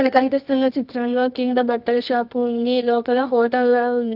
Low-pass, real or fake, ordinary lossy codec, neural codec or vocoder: 5.4 kHz; fake; none; codec, 24 kHz, 0.9 kbps, WavTokenizer, medium music audio release